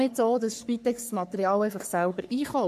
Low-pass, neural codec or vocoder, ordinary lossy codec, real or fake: 14.4 kHz; codec, 44.1 kHz, 3.4 kbps, Pupu-Codec; MP3, 96 kbps; fake